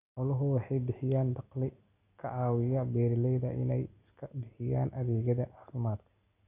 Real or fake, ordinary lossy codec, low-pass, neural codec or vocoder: real; none; 3.6 kHz; none